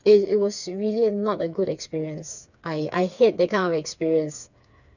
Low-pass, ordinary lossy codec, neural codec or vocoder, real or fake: 7.2 kHz; none; codec, 16 kHz, 4 kbps, FreqCodec, smaller model; fake